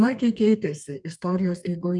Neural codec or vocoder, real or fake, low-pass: codec, 32 kHz, 1.9 kbps, SNAC; fake; 10.8 kHz